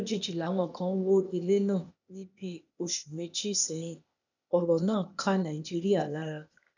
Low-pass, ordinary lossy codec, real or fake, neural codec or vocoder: 7.2 kHz; none; fake; codec, 16 kHz, 0.8 kbps, ZipCodec